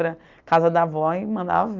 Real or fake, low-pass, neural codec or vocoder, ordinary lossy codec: real; 7.2 kHz; none; Opus, 24 kbps